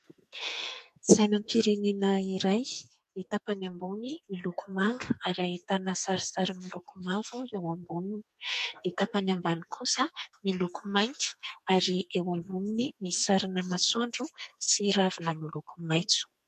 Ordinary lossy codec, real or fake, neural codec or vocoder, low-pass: MP3, 64 kbps; fake; codec, 44.1 kHz, 2.6 kbps, SNAC; 14.4 kHz